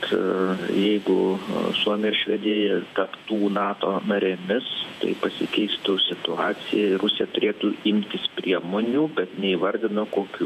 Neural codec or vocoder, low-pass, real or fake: vocoder, 48 kHz, 128 mel bands, Vocos; 14.4 kHz; fake